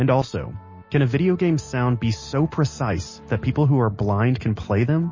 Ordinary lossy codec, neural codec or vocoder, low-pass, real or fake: MP3, 32 kbps; none; 7.2 kHz; real